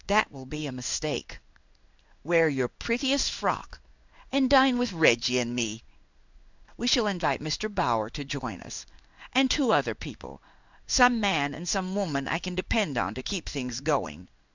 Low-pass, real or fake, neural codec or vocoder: 7.2 kHz; fake; codec, 16 kHz in and 24 kHz out, 1 kbps, XY-Tokenizer